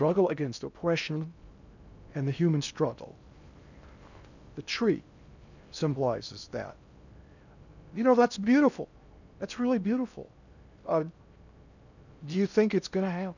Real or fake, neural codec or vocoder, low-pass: fake; codec, 16 kHz in and 24 kHz out, 0.6 kbps, FocalCodec, streaming, 4096 codes; 7.2 kHz